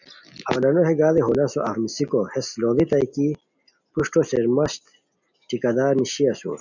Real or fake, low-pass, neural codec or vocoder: real; 7.2 kHz; none